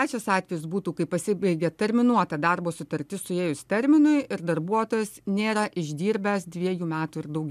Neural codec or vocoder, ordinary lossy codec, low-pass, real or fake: none; MP3, 96 kbps; 14.4 kHz; real